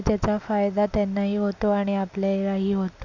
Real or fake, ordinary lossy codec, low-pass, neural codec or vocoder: real; none; 7.2 kHz; none